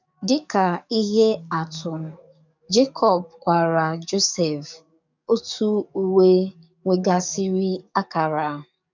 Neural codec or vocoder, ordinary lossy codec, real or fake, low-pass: codec, 44.1 kHz, 7.8 kbps, DAC; none; fake; 7.2 kHz